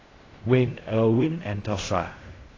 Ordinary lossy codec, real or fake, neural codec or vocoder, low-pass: AAC, 32 kbps; fake; codec, 16 kHz in and 24 kHz out, 0.4 kbps, LongCat-Audio-Codec, fine tuned four codebook decoder; 7.2 kHz